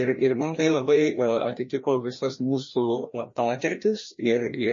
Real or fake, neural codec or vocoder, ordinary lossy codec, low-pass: fake; codec, 16 kHz, 1 kbps, FreqCodec, larger model; MP3, 32 kbps; 7.2 kHz